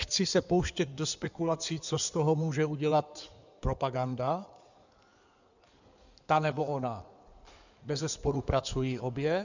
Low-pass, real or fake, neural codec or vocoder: 7.2 kHz; fake; codec, 16 kHz in and 24 kHz out, 2.2 kbps, FireRedTTS-2 codec